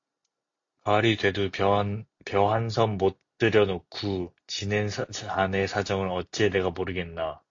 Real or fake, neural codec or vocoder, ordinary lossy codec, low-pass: real; none; AAC, 48 kbps; 7.2 kHz